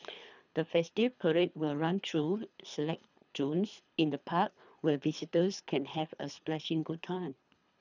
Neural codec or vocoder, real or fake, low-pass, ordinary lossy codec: codec, 24 kHz, 3 kbps, HILCodec; fake; 7.2 kHz; none